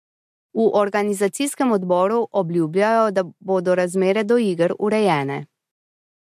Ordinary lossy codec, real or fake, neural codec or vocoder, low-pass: MP3, 64 kbps; real; none; 14.4 kHz